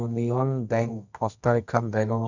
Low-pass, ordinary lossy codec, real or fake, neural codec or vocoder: 7.2 kHz; none; fake; codec, 24 kHz, 0.9 kbps, WavTokenizer, medium music audio release